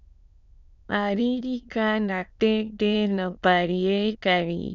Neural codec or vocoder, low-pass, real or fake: autoencoder, 22.05 kHz, a latent of 192 numbers a frame, VITS, trained on many speakers; 7.2 kHz; fake